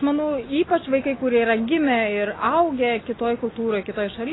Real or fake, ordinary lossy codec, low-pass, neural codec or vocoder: real; AAC, 16 kbps; 7.2 kHz; none